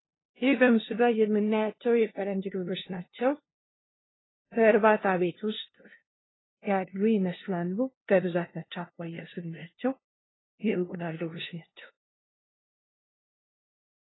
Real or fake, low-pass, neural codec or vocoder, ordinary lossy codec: fake; 7.2 kHz; codec, 16 kHz, 0.5 kbps, FunCodec, trained on LibriTTS, 25 frames a second; AAC, 16 kbps